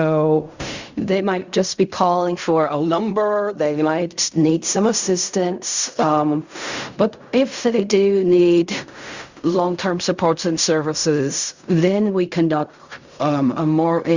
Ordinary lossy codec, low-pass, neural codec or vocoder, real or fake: Opus, 64 kbps; 7.2 kHz; codec, 16 kHz in and 24 kHz out, 0.4 kbps, LongCat-Audio-Codec, fine tuned four codebook decoder; fake